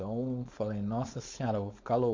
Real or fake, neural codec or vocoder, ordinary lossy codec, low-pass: fake; codec, 16 kHz, 4.8 kbps, FACodec; MP3, 48 kbps; 7.2 kHz